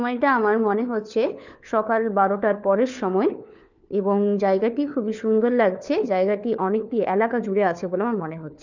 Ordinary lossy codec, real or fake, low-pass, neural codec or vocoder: none; fake; 7.2 kHz; codec, 16 kHz, 4 kbps, FunCodec, trained on LibriTTS, 50 frames a second